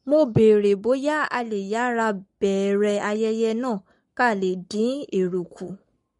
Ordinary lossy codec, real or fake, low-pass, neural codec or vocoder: MP3, 48 kbps; fake; 19.8 kHz; codec, 44.1 kHz, 7.8 kbps, Pupu-Codec